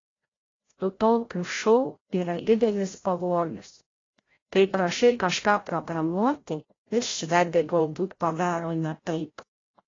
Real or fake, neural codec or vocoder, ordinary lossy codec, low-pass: fake; codec, 16 kHz, 0.5 kbps, FreqCodec, larger model; AAC, 32 kbps; 7.2 kHz